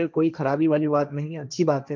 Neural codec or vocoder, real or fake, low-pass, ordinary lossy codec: codec, 16 kHz, 1.1 kbps, Voila-Tokenizer; fake; none; none